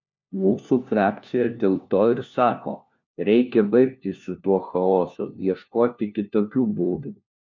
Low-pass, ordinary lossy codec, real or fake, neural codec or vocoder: 7.2 kHz; MP3, 64 kbps; fake; codec, 16 kHz, 1 kbps, FunCodec, trained on LibriTTS, 50 frames a second